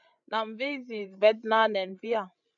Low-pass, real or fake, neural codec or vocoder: 7.2 kHz; fake; codec, 16 kHz, 16 kbps, FreqCodec, larger model